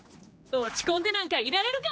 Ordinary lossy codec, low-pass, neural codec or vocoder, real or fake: none; none; codec, 16 kHz, 2 kbps, X-Codec, HuBERT features, trained on general audio; fake